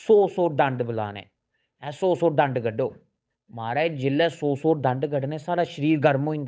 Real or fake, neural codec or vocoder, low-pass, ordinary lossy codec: fake; codec, 16 kHz, 8 kbps, FunCodec, trained on Chinese and English, 25 frames a second; none; none